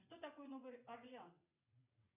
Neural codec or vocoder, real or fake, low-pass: vocoder, 22.05 kHz, 80 mel bands, WaveNeXt; fake; 3.6 kHz